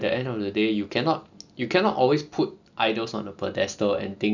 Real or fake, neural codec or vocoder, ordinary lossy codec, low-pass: real; none; none; 7.2 kHz